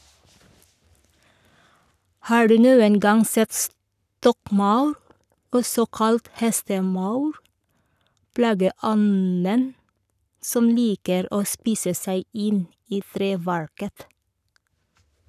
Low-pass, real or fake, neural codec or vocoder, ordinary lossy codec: 14.4 kHz; fake; codec, 44.1 kHz, 7.8 kbps, Pupu-Codec; none